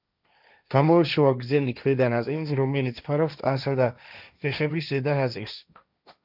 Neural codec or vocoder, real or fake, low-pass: codec, 16 kHz, 1.1 kbps, Voila-Tokenizer; fake; 5.4 kHz